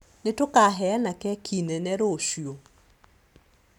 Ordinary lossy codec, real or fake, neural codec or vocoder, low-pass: none; real; none; 19.8 kHz